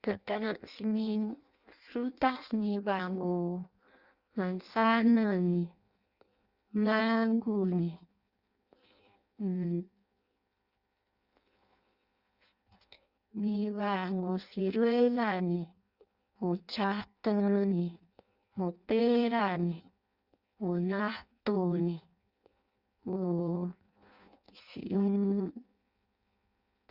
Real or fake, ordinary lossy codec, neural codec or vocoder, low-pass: fake; Opus, 64 kbps; codec, 16 kHz in and 24 kHz out, 0.6 kbps, FireRedTTS-2 codec; 5.4 kHz